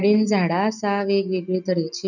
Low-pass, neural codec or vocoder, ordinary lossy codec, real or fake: 7.2 kHz; none; none; real